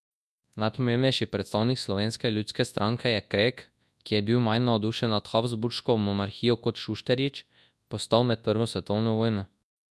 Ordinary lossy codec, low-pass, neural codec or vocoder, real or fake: none; none; codec, 24 kHz, 0.9 kbps, WavTokenizer, large speech release; fake